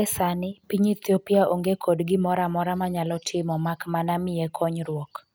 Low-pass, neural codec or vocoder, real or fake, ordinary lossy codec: none; none; real; none